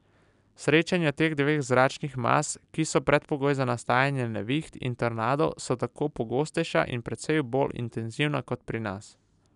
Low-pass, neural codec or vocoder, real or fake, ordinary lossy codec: 10.8 kHz; none; real; none